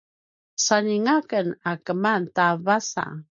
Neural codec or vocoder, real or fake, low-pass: none; real; 7.2 kHz